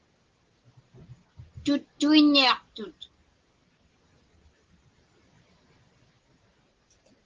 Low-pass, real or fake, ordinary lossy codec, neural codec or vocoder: 7.2 kHz; real; Opus, 16 kbps; none